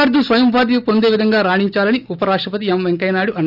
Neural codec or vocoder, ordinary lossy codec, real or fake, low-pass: none; none; real; 5.4 kHz